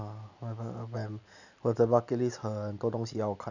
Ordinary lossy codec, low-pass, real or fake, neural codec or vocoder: none; 7.2 kHz; real; none